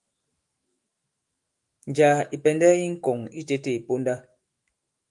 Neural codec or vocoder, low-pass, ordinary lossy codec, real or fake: autoencoder, 48 kHz, 128 numbers a frame, DAC-VAE, trained on Japanese speech; 10.8 kHz; Opus, 32 kbps; fake